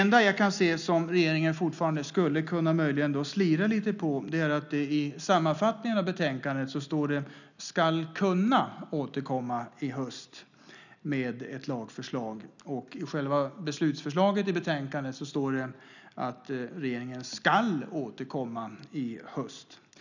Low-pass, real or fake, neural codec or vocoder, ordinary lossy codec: 7.2 kHz; real; none; none